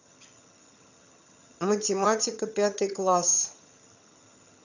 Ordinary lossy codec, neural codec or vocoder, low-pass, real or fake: none; vocoder, 22.05 kHz, 80 mel bands, HiFi-GAN; 7.2 kHz; fake